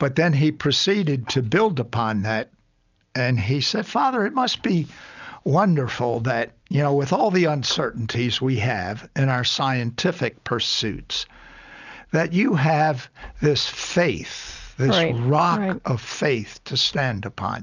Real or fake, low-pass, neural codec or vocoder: real; 7.2 kHz; none